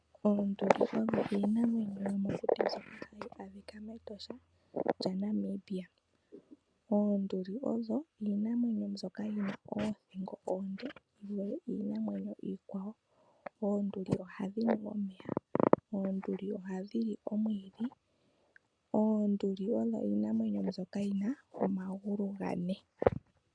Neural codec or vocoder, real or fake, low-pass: none; real; 9.9 kHz